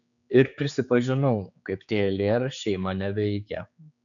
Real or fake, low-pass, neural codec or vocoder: fake; 7.2 kHz; codec, 16 kHz, 4 kbps, X-Codec, HuBERT features, trained on general audio